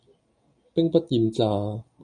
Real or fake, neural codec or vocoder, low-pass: real; none; 9.9 kHz